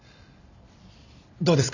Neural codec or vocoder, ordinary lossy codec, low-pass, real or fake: none; none; 7.2 kHz; real